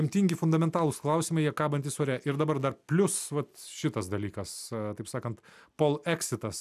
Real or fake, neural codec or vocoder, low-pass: real; none; 14.4 kHz